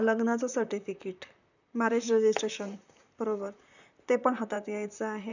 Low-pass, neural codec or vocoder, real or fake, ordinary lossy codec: 7.2 kHz; vocoder, 44.1 kHz, 128 mel bands, Pupu-Vocoder; fake; none